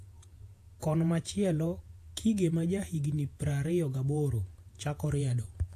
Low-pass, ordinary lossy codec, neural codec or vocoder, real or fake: 14.4 kHz; AAC, 64 kbps; vocoder, 48 kHz, 128 mel bands, Vocos; fake